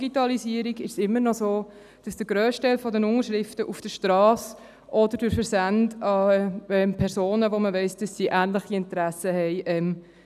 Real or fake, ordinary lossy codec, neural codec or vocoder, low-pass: real; none; none; 14.4 kHz